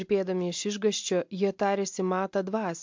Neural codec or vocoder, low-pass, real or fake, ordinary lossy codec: none; 7.2 kHz; real; MP3, 64 kbps